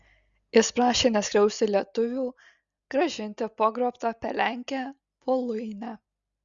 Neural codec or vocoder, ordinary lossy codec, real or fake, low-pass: none; Opus, 64 kbps; real; 7.2 kHz